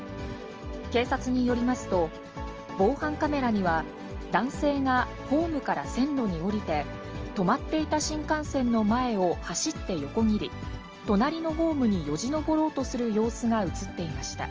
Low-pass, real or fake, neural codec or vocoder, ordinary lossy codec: 7.2 kHz; real; none; Opus, 24 kbps